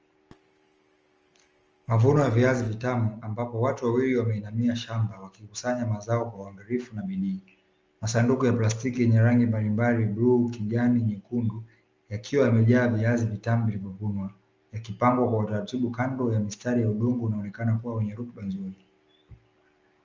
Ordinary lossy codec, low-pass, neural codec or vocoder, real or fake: Opus, 24 kbps; 7.2 kHz; none; real